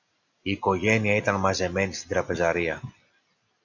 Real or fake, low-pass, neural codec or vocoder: real; 7.2 kHz; none